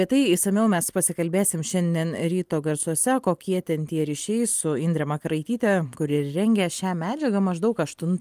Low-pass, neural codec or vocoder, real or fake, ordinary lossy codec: 14.4 kHz; none; real; Opus, 32 kbps